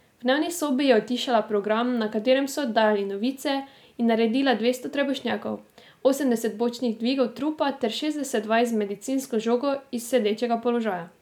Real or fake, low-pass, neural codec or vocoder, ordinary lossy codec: real; 19.8 kHz; none; none